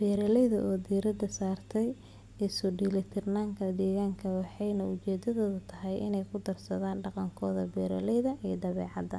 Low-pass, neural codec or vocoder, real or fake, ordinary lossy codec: none; none; real; none